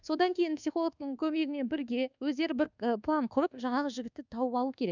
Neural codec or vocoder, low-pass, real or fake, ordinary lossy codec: codec, 24 kHz, 1.2 kbps, DualCodec; 7.2 kHz; fake; none